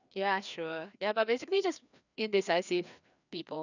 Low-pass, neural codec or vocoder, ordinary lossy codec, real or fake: 7.2 kHz; codec, 16 kHz, 2 kbps, FreqCodec, larger model; none; fake